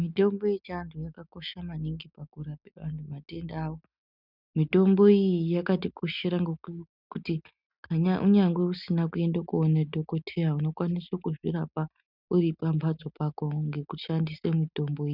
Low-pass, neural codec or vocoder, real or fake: 5.4 kHz; none; real